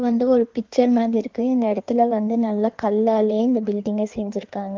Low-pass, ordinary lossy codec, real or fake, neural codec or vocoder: 7.2 kHz; Opus, 32 kbps; fake; codec, 16 kHz in and 24 kHz out, 1.1 kbps, FireRedTTS-2 codec